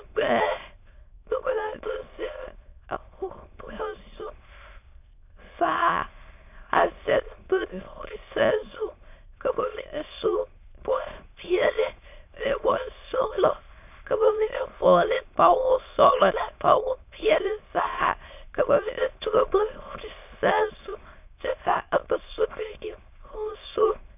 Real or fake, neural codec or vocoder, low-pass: fake; autoencoder, 22.05 kHz, a latent of 192 numbers a frame, VITS, trained on many speakers; 3.6 kHz